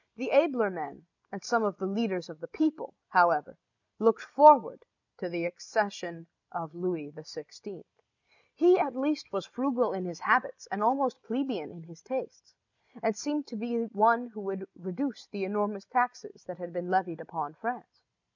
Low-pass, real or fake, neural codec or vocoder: 7.2 kHz; real; none